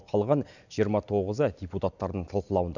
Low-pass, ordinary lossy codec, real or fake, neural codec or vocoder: 7.2 kHz; none; real; none